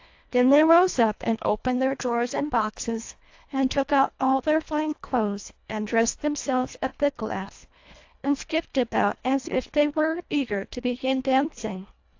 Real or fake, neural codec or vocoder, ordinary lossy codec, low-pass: fake; codec, 24 kHz, 1.5 kbps, HILCodec; AAC, 48 kbps; 7.2 kHz